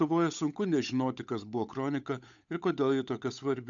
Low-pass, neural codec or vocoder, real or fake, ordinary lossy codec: 7.2 kHz; codec, 16 kHz, 8 kbps, FunCodec, trained on Chinese and English, 25 frames a second; fake; AAC, 64 kbps